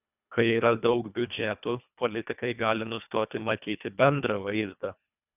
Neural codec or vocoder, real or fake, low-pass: codec, 24 kHz, 1.5 kbps, HILCodec; fake; 3.6 kHz